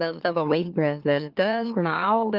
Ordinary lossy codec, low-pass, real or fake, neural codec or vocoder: Opus, 32 kbps; 5.4 kHz; fake; autoencoder, 44.1 kHz, a latent of 192 numbers a frame, MeloTTS